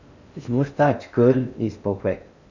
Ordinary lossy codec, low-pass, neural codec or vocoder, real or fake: none; 7.2 kHz; codec, 16 kHz in and 24 kHz out, 0.6 kbps, FocalCodec, streaming, 4096 codes; fake